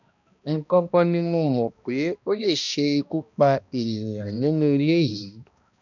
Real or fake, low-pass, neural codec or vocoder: fake; 7.2 kHz; codec, 16 kHz, 1 kbps, X-Codec, HuBERT features, trained on balanced general audio